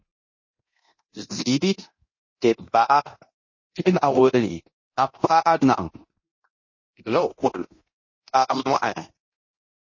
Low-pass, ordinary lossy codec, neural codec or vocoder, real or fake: 7.2 kHz; MP3, 32 kbps; codec, 24 kHz, 0.9 kbps, DualCodec; fake